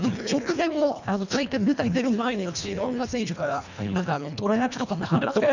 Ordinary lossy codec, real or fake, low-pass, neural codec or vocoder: none; fake; 7.2 kHz; codec, 24 kHz, 1.5 kbps, HILCodec